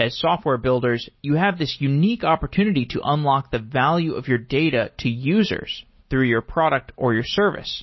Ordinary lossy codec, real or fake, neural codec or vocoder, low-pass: MP3, 24 kbps; real; none; 7.2 kHz